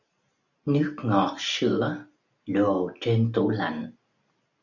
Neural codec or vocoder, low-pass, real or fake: none; 7.2 kHz; real